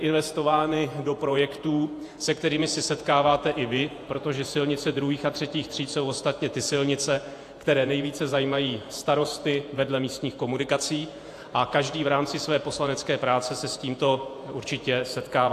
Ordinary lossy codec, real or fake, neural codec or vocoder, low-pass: AAC, 64 kbps; fake; vocoder, 48 kHz, 128 mel bands, Vocos; 14.4 kHz